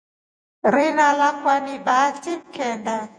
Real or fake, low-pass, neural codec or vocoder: fake; 9.9 kHz; vocoder, 48 kHz, 128 mel bands, Vocos